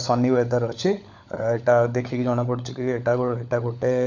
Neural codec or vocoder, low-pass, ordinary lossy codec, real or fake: codec, 16 kHz, 16 kbps, FunCodec, trained on LibriTTS, 50 frames a second; 7.2 kHz; none; fake